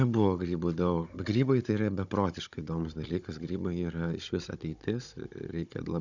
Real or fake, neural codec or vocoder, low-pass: fake; codec, 16 kHz, 16 kbps, FunCodec, trained on Chinese and English, 50 frames a second; 7.2 kHz